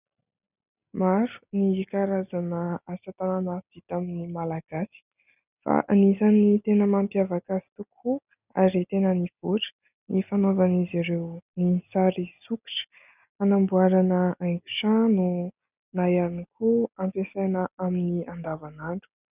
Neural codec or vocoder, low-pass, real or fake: none; 3.6 kHz; real